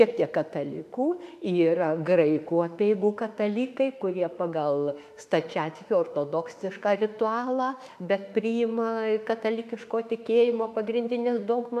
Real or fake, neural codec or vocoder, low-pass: fake; autoencoder, 48 kHz, 32 numbers a frame, DAC-VAE, trained on Japanese speech; 14.4 kHz